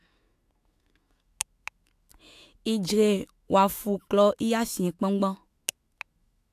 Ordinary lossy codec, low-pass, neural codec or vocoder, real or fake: AAC, 64 kbps; 14.4 kHz; autoencoder, 48 kHz, 128 numbers a frame, DAC-VAE, trained on Japanese speech; fake